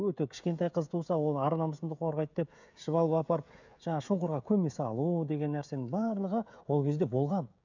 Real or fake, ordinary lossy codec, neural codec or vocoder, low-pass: fake; none; codec, 16 kHz, 16 kbps, FreqCodec, smaller model; 7.2 kHz